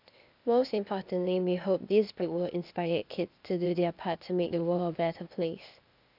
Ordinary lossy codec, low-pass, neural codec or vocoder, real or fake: none; 5.4 kHz; codec, 16 kHz, 0.8 kbps, ZipCodec; fake